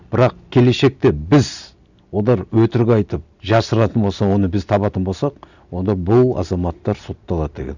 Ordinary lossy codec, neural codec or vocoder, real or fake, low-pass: none; none; real; 7.2 kHz